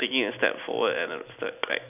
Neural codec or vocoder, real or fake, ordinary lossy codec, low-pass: none; real; none; 3.6 kHz